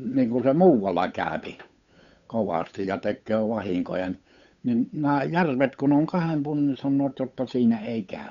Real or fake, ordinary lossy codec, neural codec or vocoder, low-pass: fake; none; codec, 16 kHz, 8 kbps, FunCodec, trained on Chinese and English, 25 frames a second; 7.2 kHz